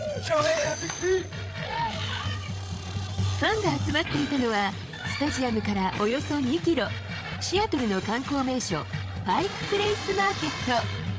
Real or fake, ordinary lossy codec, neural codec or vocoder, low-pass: fake; none; codec, 16 kHz, 8 kbps, FreqCodec, larger model; none